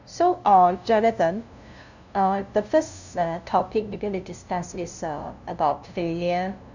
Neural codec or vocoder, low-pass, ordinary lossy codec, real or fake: codec, 16 kHz, 0.5 kbps, FunCodec, trained on LibriTTS, 25 frames a second; 7.2 kHz; none; fake